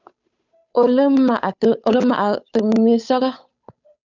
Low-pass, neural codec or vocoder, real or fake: 7.2 kHz; codec, 16 kHz, 8 kbps, FunCodec, trained on Chinese and English, 25 frames a second; fake